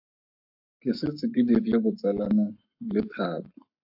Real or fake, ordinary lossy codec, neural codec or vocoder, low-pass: fake; AAC, 48 kbps; codec, 44.1 kHz, 7.8 kbps, Pupu-Codec; 5.4 kHz